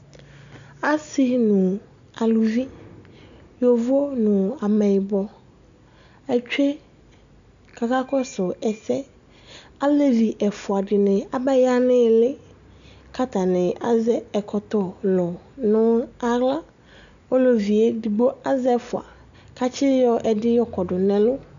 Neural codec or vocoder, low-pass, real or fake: none; 7.2 kHz; real